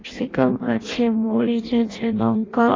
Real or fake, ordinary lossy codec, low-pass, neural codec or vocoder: fake; AAC, 32 kbps; 7.2 kHz; codec, 16 kHz in and 24 kHz out, 0.6 kbps, FireRedTTS-2 codec